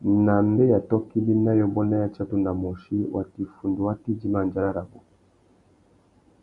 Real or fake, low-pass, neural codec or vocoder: real; 10.8 kHz; none